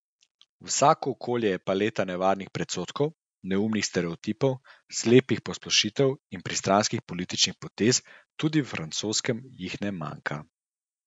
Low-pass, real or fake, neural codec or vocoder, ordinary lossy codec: 9.9 kHz; real; none; none